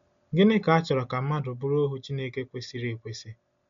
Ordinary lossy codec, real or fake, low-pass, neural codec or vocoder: MP3, 48 kbps; real; 7.2 kHz; none